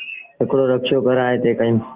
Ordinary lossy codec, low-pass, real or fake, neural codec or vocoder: Opus, 16 kbps; 3.6 kHz; real; none